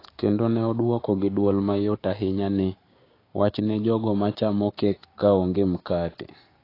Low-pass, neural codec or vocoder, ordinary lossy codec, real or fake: 5.4 kHz; codec, 16 kHz, 6 kbps, DAC; AAC, 24 kbps; fake